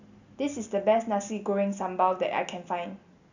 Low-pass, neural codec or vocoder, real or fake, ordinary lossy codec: 7.2 kHz; none; real; none